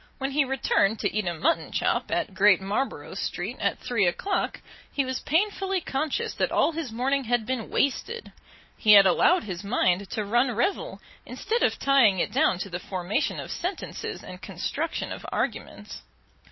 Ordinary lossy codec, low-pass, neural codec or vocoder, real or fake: MP3, 24 kbps; 7.2 kHz; none; real